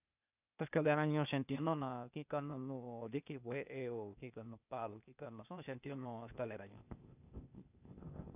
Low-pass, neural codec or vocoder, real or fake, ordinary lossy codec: 3.6 kHz; codec, 16 kHz, 0.8 kbps, ZipCodec; fake; none